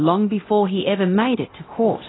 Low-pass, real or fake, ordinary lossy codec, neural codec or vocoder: 7.2 kHz; fake; AAC, 16 kbps; codec, 24 kHz, 0.9 kbps, DualCodec